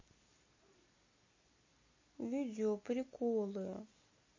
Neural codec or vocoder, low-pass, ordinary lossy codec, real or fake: none; 7.2 kHz; MP3, 32 kbps; real